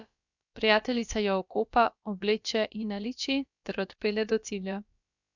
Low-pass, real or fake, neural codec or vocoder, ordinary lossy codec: 7.2 kHz; fake; codec, 16 kHz, about 1 kbps, DyCAST, with the encoder's durations; none